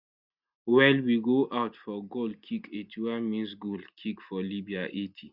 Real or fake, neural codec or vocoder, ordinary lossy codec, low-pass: real; none; Opus, 64 kbps; 5.4 kHz